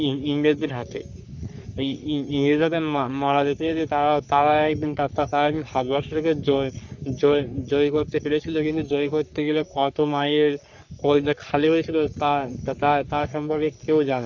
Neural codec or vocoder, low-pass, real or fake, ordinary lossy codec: codec, 44.1 kHz, 3.4 kbps, Pupu-Codec; 7.2 kHz; fake; none